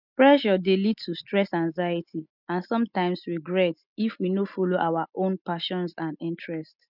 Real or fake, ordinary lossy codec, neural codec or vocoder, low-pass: real; none; none; 5.4 kHz